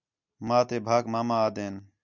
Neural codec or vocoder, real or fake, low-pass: none; real; 7.2 kHz